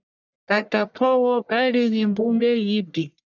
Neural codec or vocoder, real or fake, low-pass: codec, 44.1 kHz, 1.7 kbps, Pupu-Codec; fake; 7.2 kHz